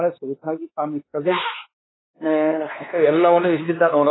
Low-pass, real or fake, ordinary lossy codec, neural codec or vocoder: 7.2 kHz; fake; AAC, 16 kbps; codec, 16 kHz, 2 kbps, X-Codec, WavLM features, trained on Multilingual LibriSpeech